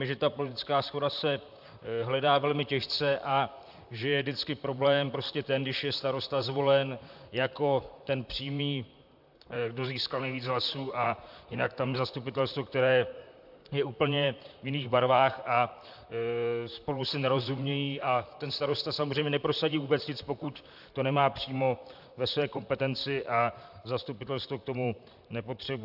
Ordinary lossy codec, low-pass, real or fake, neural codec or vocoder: AAC, 48 kbps; 5.4 kHz; fake; vocoder, 44.1 kHz, 128 mel bands, Pupu-Vocoder